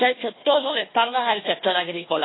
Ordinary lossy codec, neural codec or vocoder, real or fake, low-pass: AAC, 16 kbps; codec, 16 kHz, 1 kbps, FunCodec, trained on Chinese and English, 50 frames a second; fake; 7.2 kHz